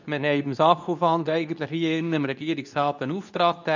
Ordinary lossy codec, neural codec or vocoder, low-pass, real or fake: none; codec, 24 kHz, 0.9 kbps, WavTokenizer, medium speech release version 2; 7.2 kHz; fake